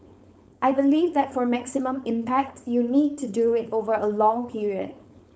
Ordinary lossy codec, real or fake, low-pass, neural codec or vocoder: none; fake; none; codec, 16 kHz, 4.8 kbps, FACodec